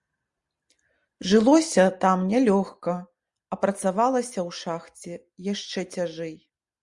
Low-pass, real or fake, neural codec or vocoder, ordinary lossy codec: 10.8 kHz; real; none; Opus, 64 kbps